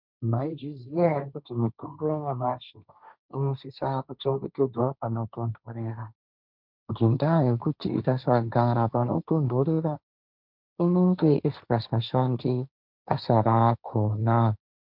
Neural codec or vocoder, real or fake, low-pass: codec, 16 kHz, 1.1 kbps, Voila-Tokenizer; fake; 5.4 kHz